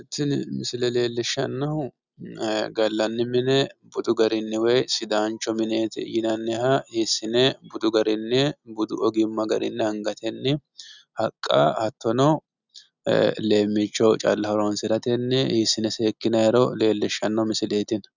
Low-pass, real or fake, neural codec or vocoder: 7.2 kHz; real; none